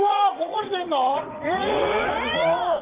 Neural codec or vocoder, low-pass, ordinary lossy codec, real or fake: vocoder, 44.1 kHz, 80 mel bands, Vocos; 3.6 kHz; Opus, 32 kbps; fake